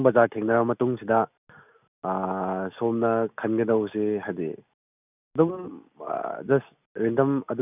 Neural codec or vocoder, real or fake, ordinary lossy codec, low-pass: none; real; none; 3.6 kHz